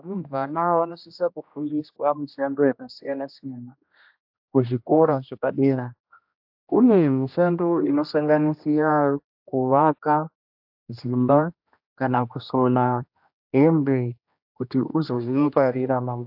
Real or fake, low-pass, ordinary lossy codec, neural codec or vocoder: fake; 5.4 kHz; AAC, 48 kbps; codec, 16 kHz, 1 kbps, X-Codec, HuBERT features, trained on general audio